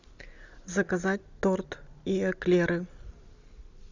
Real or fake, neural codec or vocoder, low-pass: real; none; 7.2 kHz